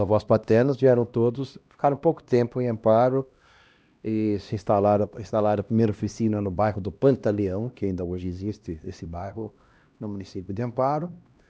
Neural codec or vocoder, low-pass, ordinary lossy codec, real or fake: codec, 16 kHz, 1 kbps, X-Codec, HuBERT features, trained on LibriSpeech; none; none; fake